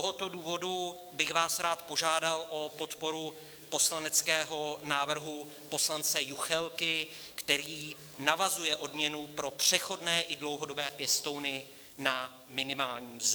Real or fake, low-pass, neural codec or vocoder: fake; 19.8 kHz; codec, 44.1 kHz, 7.8 kbps, DAC